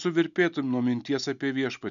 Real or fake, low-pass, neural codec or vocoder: real; 7.2 kHz; none